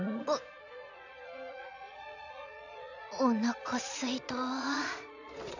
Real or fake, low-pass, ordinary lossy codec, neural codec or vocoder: fake; 7.2 kHz; none; vocoder, 22.05 kHz, 80 mel bands, Vocos